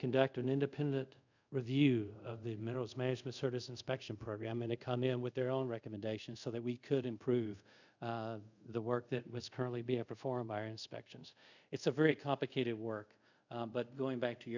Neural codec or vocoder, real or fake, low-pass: codec, 24 kHz, 0.5 kbps, DualCodec; fake; 7.2 kHz